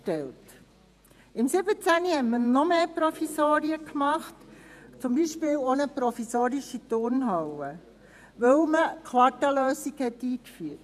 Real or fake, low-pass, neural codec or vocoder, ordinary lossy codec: fake; 14.4 kHz; vocoder, 48 kHz, 128 mel bands, Vocos; none